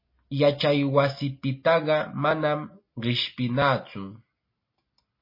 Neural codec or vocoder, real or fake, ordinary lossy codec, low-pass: none; real; MP3, 24 kbps; 5.4 kHz